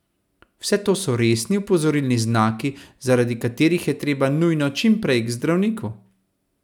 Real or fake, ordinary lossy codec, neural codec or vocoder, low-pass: real; none; none; 19.8 kHz